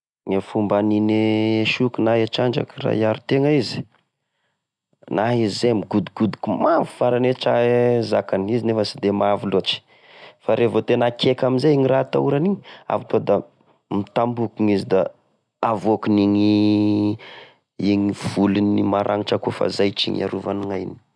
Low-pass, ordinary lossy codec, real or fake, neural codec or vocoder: none; none; real; none